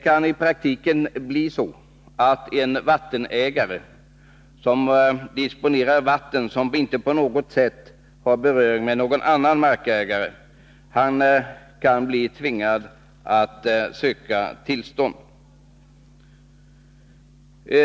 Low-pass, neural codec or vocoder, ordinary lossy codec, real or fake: none; none; none; real